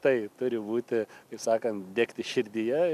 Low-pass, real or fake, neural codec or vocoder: 14.4 kHz; real; none